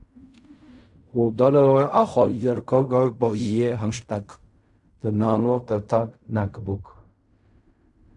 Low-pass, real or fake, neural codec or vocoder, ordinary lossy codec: 10.8 kHz; fake; codec, 16 kHz in and 24 kHz out, 0.4 kbps, LongCat-Audio-Codec, fine tuned four codebook decoder; Opus, 64 kbps